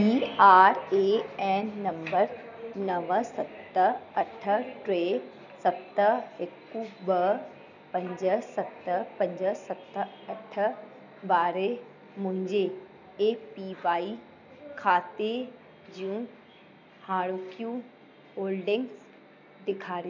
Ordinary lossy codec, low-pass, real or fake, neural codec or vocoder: none; 7.2 kHz; real; none